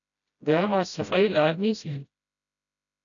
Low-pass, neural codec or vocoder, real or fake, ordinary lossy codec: 7.2 kHz; codec, 16 kHz, 0.5 kbps, FreqCodec, smaller model; fake; MP3, 96 kbps